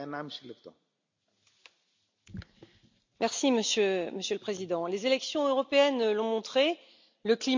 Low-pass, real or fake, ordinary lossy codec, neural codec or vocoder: 7.2 kHz; real; MP3, 64 kbps; none